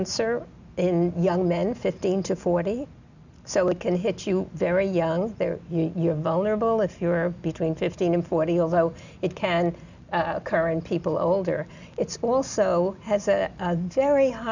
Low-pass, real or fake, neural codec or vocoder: 7.2 kHz; real; none